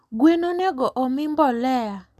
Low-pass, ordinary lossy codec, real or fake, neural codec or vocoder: 14.4 kHz; none; real; none